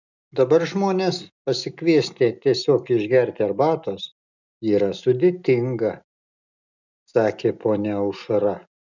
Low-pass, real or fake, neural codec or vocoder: 7.2 kHz; real; none